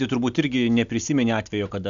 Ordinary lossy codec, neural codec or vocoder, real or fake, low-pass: MP3, 96 kbps; none; real; 7.2 kHz